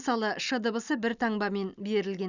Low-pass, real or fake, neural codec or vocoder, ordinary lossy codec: 7.2 kHz; real; none; none